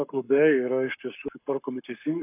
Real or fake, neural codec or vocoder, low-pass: fake; codec, 44.1 kHz, 7.8 kbps, Pupu-Codec; 3.6 kHz